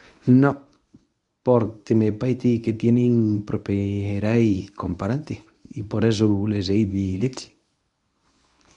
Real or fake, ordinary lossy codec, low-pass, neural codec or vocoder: fake; none; 10.8 kHz; codec, 24 kHz, 0.9 kbps, WavTokenizer, medium speech release version 1